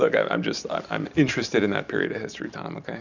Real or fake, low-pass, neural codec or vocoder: real; 7.2 kHz; none